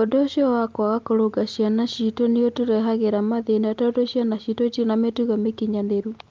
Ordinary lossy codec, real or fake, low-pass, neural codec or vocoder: Opus, 32 kbps; real; 7.2 kHz; none